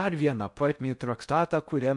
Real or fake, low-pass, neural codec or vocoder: fake; 10.8 kHz; codec, 16 kHz in and 24 kHz out, 0.6 kbps, FocalCodec, streaming, 4096 codes